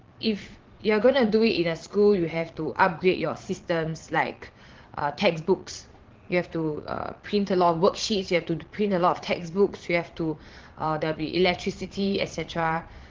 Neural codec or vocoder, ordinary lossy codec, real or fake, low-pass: vocoder, 22.05 kHz, 80 mel bands, Vocos; Opus, 16 kbps; fake; 7.2 kHz